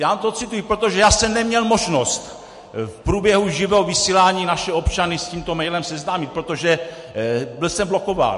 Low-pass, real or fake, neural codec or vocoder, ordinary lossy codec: 14.4 kHz; real; none; MP3, 48 kbps